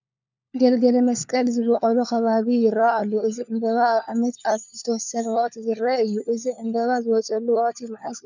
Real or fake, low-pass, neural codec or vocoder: fake; 7.2 kHz; codec, 16 kHz, 4 kbps, FunCodec, trained on LibriTTS, 50 frames a second